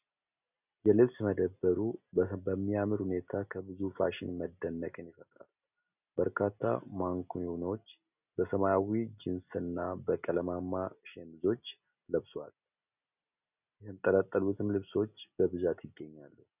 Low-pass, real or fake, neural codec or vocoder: 3.6 kHz; real; none